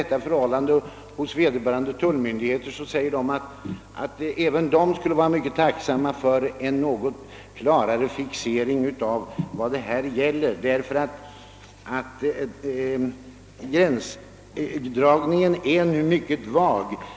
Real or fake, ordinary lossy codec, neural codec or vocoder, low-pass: real; none; none; none